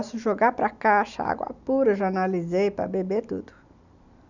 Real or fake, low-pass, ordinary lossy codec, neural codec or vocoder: real; 7.2 kHz; none; none